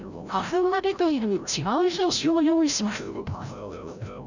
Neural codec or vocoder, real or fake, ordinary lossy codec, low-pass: codec, 16 kHz, 0.5 kbps, FreqCodec, larger model; fake; none; 7.2 kHz